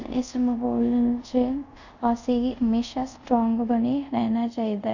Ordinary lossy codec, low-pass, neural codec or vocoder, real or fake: none; 7.2 kHz; codec, 24 kHz, 0.5 kbps, DualCodec; fake